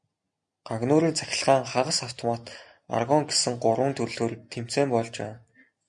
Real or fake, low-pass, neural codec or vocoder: real; 9.9 kHz; none